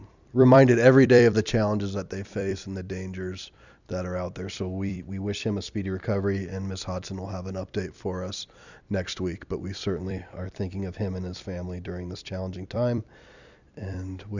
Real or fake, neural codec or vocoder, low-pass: fake; vocoder, 44.1 kHz, 128 mel bands every 256 samples, BigVGAN v2; 7.2 kHz